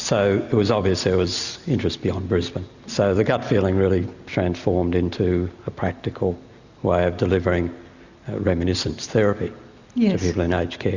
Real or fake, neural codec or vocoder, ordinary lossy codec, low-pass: real; none; Opus, 64 kbps; 7.2 kHz